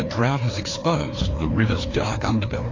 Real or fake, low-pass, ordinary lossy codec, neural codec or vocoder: fake; 7.2 kHz; AAC, 32 kbps; codec, 16 kHz, 2 kbps, FreqCodec, larger model